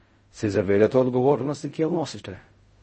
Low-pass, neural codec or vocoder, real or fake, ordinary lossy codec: 10.8 kHz; codec, 16 kHz in and 24 kHz out, 0.4 kbps, LongCat-Audio-Codec, fine tuned four codebook decoder; fake; MP3, 32 kbps